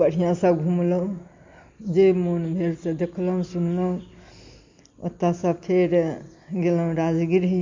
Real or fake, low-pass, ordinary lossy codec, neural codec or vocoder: real; 7.2 kHz; MP3, 48 kbps; none